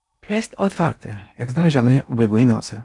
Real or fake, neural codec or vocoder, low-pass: fake; codec, 16 kHz in and 24 kHz out, 0.8 kbps, FocalCodec, streaming, 65536 codes; 10.8 kHz